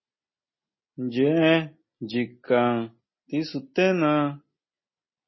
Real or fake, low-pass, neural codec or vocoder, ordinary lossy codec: real; 7.2 kHz; none; MP3, 24 kbps